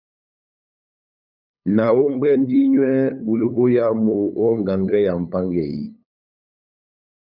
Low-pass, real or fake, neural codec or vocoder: 5.4 kHz; fake; codec, 16 kHz, 8 kbps, FunCodec, trained on LibriTTS, 25 frames a second